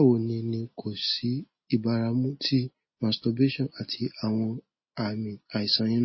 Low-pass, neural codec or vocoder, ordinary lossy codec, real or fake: 7.2 kHz; none; MP3, 24 kbps; real